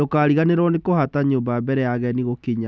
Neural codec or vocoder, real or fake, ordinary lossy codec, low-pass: none; real; none; none